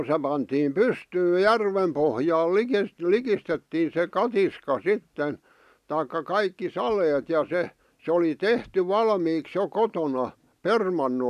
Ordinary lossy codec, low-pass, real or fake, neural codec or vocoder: none; 14.4 kHz; real; none